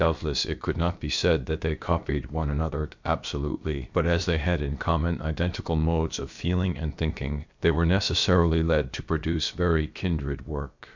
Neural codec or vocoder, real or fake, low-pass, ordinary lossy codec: codec, 16 kHz, about 1 kbps, DyCAST, with the encoder's durations; fake; 7.2 kHz; MP3, 64 kbps